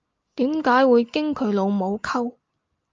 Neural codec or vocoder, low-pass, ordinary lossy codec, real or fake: none; 7.2 kHz; Opus, 24 kbps; real